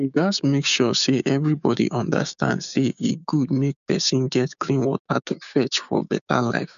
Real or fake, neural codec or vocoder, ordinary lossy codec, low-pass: fake; codec, 16 kHz, 6 kbps, DAC; none; 7.2 kHz